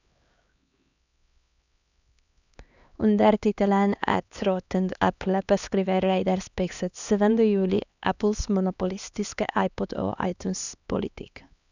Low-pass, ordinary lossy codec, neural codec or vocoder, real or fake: 7.2 kHz; none; codec, 16 kHz, 2 kbps, X-Codec, HuBERT features, trained on LibriSpeech; fake